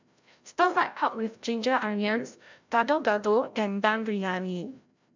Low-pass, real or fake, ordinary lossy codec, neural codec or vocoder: 7.2 kHz; fake; MP3, 64 kbps; codec, 16 kHz, 0.5 kbps, FreqCodec, larger model